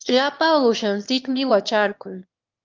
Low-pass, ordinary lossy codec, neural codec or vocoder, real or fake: 7.2 kHz; Opus, 32 kbps; autoencoder, 22.05 kHz, a latent of 192 numbers a frame, VITS, trained on one speaker; fake